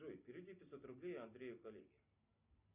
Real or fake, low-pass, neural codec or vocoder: real; 3.6 kHz; none